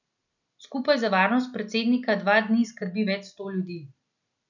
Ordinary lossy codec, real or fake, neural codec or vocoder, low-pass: none; real; none; 7.2 kHz